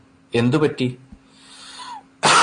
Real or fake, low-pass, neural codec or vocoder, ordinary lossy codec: real; 9.9 kHz; none; MP3, 48 kbps